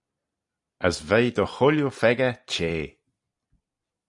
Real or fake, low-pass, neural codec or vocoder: fake; 10.8 kHz; vocoder, 44.1 kHz, 128 mel bands every 256 samples, BigVGAN v2